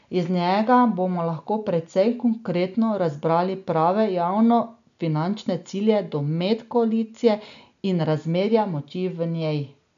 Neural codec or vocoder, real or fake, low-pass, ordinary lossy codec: none; real; 7.2 kHz; none